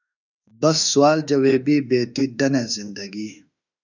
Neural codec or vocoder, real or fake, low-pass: autoencoder, 48 kHz, 32 numbers a frame, DAC-VAE, trained on Japanese speech; fake; 7.2 kHz